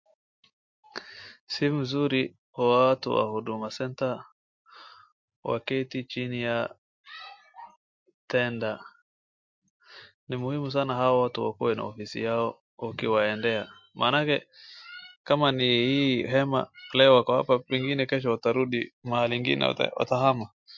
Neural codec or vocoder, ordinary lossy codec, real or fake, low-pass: none; MP3, 48 kbps; real; 7.2 kHz